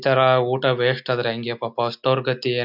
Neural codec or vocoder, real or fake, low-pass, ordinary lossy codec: none; real; 5.4 kHz; none